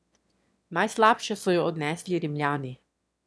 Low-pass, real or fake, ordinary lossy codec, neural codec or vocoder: none; fake; none; autoencoder, 22.05 kHz, a latent of 192 numbers a frame, VITS, trained on one speaker